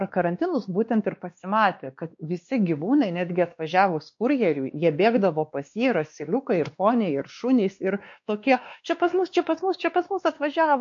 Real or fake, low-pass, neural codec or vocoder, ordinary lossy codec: fake; 7.2 kHz; codec, 16 kHz, 2 kbps, X-Codec, WavLM features, trained on Multilingual LibriSpeech; MP3, 48 kbps